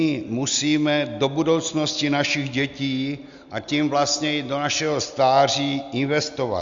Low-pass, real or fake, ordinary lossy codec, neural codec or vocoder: 7.2 kHz; real; Opus, 64 kbps; none